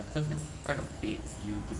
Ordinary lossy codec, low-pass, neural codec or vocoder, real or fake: MP3, 64 kbps; 10.8 kHz; codec, 44.1 kHz, 2.6 kbps, SNAC; fake